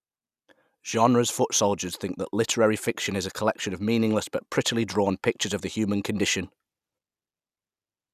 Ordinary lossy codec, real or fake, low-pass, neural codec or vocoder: none; real; 14.4 kHz; none